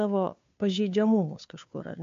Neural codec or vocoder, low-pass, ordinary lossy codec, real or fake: codec, 16 kHz, 2 kbps, FunCodec, trained on Chinese and English, 25 frames a second; 7.2 kHz; MP3, 48 kbps; fake